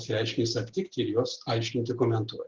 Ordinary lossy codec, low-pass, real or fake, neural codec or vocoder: Opus, 16 kbps; 7.2 kHz; real; none